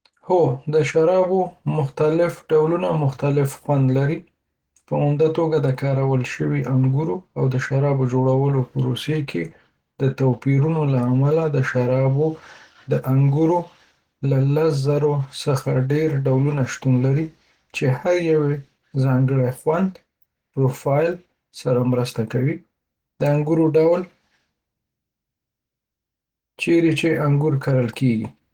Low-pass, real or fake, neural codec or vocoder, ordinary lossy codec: 19.8 kHz; real; none; Opus, 16 kbps